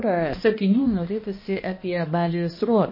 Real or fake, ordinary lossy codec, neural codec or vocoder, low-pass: fake; MP3, 24 kbps; codec, 16 kHz, 1 kbps, X-Codec, HuBERT features, trained on balanced general audio; 5.4 kHz